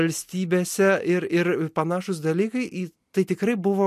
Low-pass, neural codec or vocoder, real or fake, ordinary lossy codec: 14.4 kHz; none; real; MP3, 64 kbps